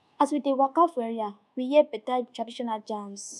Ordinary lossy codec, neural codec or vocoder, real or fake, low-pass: none; codec, 24 kHz, 1.2 kbps, DualCodec; fake; none